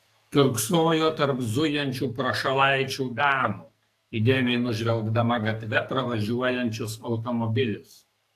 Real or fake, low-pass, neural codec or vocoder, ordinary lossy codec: fake; 14.4 kHz; codec, 44.1 kHz, 2.6 kbps, SNAC; AAC, 64 kbps